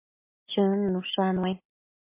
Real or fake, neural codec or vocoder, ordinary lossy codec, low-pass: real; none; MP3, 24 kbps; 3.6 kHz